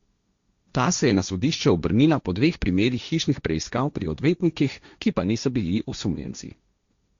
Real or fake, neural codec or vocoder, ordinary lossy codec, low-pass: fake; codec, 16 kHz, 1.1 kbps, Voila-Tokenizer; Opus, 64 kbps; 7.2 kHz